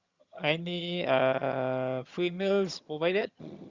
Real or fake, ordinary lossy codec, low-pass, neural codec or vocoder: fake; Opus, 64 kbps; 7.2 kHz; vocoder, 22.05 kHz, 80 mel bands, HiFi-GAN